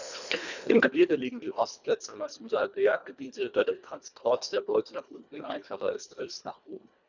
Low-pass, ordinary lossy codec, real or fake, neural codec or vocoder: 7.2 kHz; none; fake; codec, 24 kHz, 1.5 kbps, HILCodec